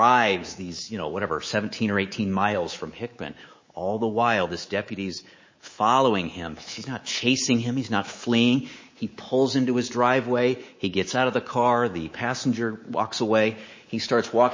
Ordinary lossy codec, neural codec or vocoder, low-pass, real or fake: MP3, 32 kbps; codec, 24 kHz, 3.1 kbps, DualCodec; 7.2 kHz; fake